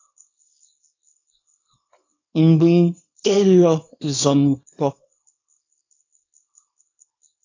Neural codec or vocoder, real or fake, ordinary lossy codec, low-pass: codec, 24 kHz, 0.9 kbps, WavTokenizer, small release; fake; AAC, 48 kbps; 7.2 kHz